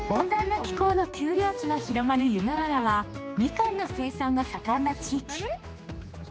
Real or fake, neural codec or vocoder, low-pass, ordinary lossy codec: fake; codec, 16 kHz, 2 kbps, X-Codec, HuBERT features, trained on general audio; none; none